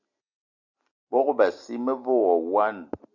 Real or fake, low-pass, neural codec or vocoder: real; 7.2 kHz; none